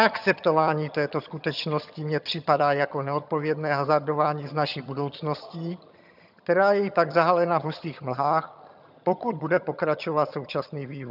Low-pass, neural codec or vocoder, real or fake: 5.4 kHz; vocoder, 22.05 kHz, 80 mel bands, HiFi-GAN; fake